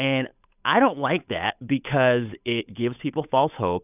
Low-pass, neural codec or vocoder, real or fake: 3.6 kHz; codec, 16 kHz, 8 kbps, FunCodec, trained on Chinese and English, 25 frames a second; fake